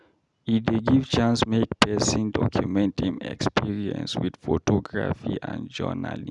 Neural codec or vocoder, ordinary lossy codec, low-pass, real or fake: none; none; 10.8 kHz; real